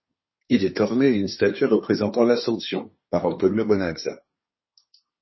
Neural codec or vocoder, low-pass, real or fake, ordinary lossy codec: codec, 24 kHz, 1 kbps, SNAC; 7.2 kHz; fake; MP3, 24 kbps